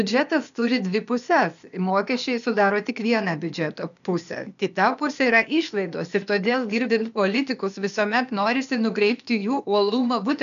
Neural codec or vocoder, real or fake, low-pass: codec, 16 kHz, 0.8 kbps, ZipCodec; fake; 7.2 kHz